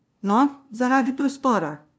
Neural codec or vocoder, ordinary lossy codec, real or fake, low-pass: codec, 16 kHz, 0.5 kbps, FunCodec, trained on LibriTTS, 25 frames a second; none; fake; none